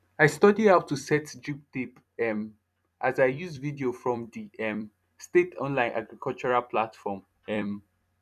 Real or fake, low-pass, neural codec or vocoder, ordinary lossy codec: fake; 14.4 kHz; vocoder, 44.1 kHz, 128 mel bands every 256 samples, BigVGAN v2; none